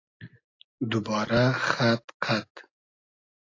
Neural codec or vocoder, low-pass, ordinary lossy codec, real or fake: none; 7.2 kHz; AAC, 32 kbps; real